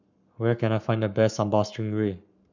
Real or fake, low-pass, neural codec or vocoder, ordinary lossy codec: fake; 7.2 kHz; codec, 44.1 kHz, 7.8 kbps, Pupu-Codec; none